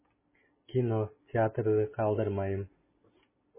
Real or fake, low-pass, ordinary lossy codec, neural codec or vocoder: real; 3.6 kHz; MP3, 16 kbps; none